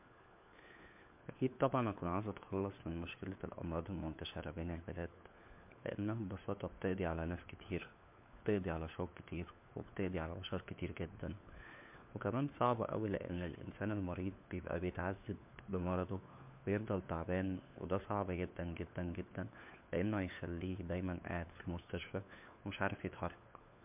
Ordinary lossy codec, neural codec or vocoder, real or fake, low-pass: MP3, 32 kbps; codec, 16 kHz, 4 kbps, FunCodec, trained on LibriTTS, 50 frames a second; fake; 3.6 kHz